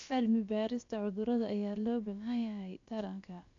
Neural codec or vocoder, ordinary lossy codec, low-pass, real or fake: codec, 16 kHz, about 1 kbps, DyCAST, with the encoder's durations; AAC, 48 kbps; 7.2 kHz; fake